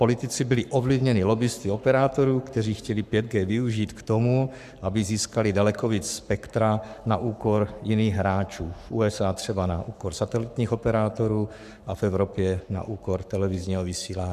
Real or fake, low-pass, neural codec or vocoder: fake; 14.4 kHz; codec, 44.1 kHz, 7.8 kbps, Pupu-Codec